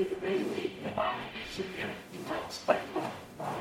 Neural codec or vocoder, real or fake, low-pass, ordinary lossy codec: codec, 44.1 kHz, 0.9 kbps, DAC; fake; 19.8 kHz; MP3, 64 kbps